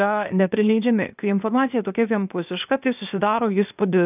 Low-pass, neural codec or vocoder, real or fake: 3.6 kHz; codec, 16 kHz, 0.8 kbps, ZipCodec; fake